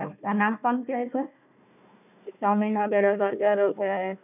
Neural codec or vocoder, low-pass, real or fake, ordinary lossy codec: codec, 16 kHz, 1 kbps, FunCodec, trained on Chinese and English, 50 frames a second; 3.6 kHz; fake; none